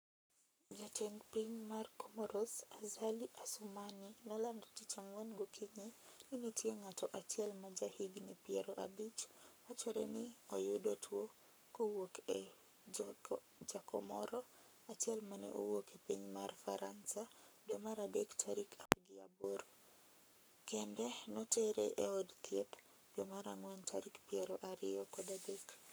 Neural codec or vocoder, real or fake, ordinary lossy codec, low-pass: codec, 44.1 kHz, 7.8 kbps, Pupu-Codec; fake; none; none